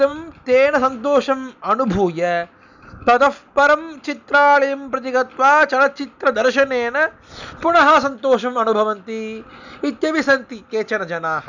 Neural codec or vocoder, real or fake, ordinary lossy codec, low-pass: none; real; none; 7.2 kHz